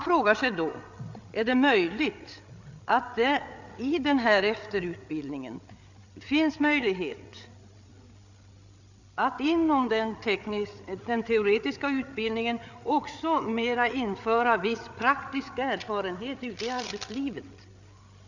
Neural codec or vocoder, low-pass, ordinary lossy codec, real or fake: codec, 16 kHz, 8 kbps, FreqCodec, larger model; 7.2 kHz; none; fake